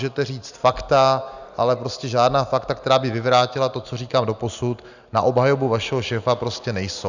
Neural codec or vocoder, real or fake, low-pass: none; real; 7.2 kHz